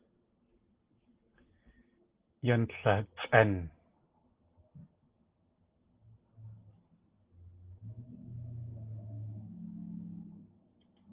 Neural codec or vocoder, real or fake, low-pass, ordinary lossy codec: none; real; 3.6 kHz; Opus, 16 kbps